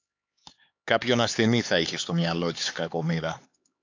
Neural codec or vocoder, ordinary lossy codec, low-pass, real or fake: codec, 16 kHz, 4 kbps, X-Codec, HuBERT features, trained on LibriSpeech; AAC, 48 kbps; 7.2 kHz; fake